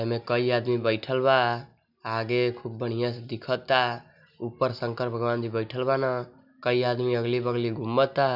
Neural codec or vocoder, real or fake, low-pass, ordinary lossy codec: none; real; 5.4 kHz; none